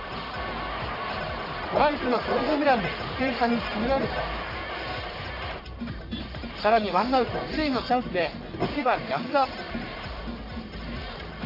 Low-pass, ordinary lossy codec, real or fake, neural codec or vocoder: 5.4 kHz; AAC, 32 kbps; fake; codec, 44.1 kHz, 1.7 kbps, Pupu-Codec